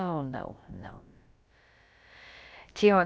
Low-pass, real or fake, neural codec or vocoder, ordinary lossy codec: none; fake; codec, 16 kHz, about 1 kbps, DyCAST, with the encoder's durations; none